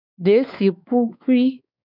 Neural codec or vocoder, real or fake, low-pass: codec, 16 kHz, 4 kbps, X-Codec, WavLM features, trained on Multilingual LibriSpeech; fake; 5.4 kHz